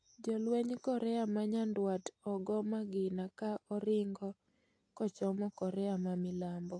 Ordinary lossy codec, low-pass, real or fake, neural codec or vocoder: AAC, 48 kbps; 9.9 kHz; real; none